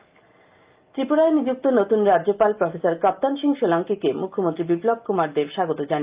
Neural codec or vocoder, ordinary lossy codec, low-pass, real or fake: none; Opus, 24 kbps; 3.6 kHz; real